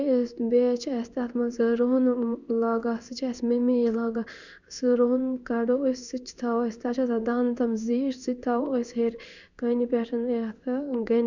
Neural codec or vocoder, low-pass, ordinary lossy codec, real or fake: codec, 16 kHz in and 24 kHz out, 1 kbps, XY-Tokenizer; 7.2 kHz; none; fake